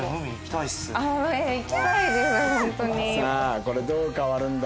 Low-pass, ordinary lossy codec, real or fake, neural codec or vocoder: none; none; real; none